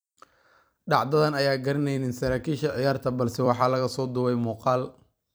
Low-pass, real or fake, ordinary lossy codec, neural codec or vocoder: none; fake; none; vocoder, 44.1 kHz, 128 mel bands every 256 samples, BigVGAN v2